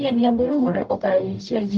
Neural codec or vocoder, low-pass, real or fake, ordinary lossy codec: codec, 44.1 kHz, 0.9 kbps, DAC; 9.9 kHz; fake; Opus, 32 kbps